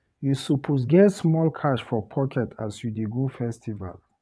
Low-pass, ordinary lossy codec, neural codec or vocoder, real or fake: none; none; vocoder, 22.05 kHz, 80 mel bands, WaveNeXt; fake